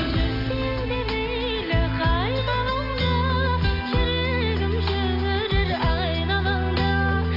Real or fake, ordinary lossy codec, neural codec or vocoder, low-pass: real; AAC, 32 kbps; none; 5.4 kHz